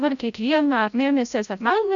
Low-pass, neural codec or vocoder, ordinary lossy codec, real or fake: 7.2 kHz; codec, 16 kHz, 0.5 kbps, FreqCodec, larger model; MP3, 96 kbps; fake